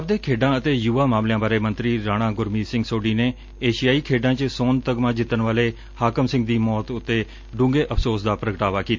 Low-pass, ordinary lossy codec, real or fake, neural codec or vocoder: 7.2 kHz; MP3, 48 kbps; real; none